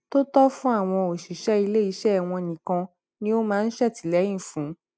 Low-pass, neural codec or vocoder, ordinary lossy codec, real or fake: none; none; none; real